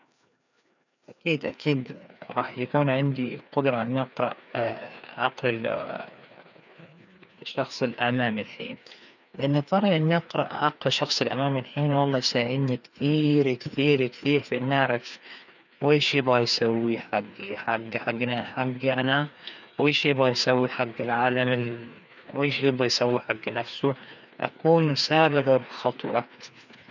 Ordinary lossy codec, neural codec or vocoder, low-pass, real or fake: none; codec, 16 kHz, 2 kbps, FreqCodec, larger model; 7.2 kHz; fake